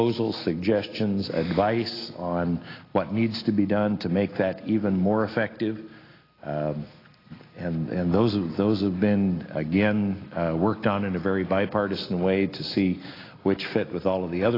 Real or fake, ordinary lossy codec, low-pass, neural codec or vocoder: real; AAC, 24 kbps; 5.4 kHz; none